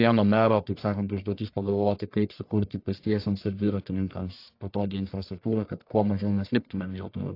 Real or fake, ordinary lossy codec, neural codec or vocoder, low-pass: fake; AAC, 32 kbps; codec, 44.1 kHz, 1.7 kbps, Pupu-Codec; 5.4 kHz